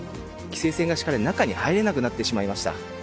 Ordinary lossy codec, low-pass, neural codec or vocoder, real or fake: none; none; none; real